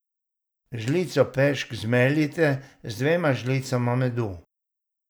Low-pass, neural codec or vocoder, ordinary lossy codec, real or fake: none; none; none; real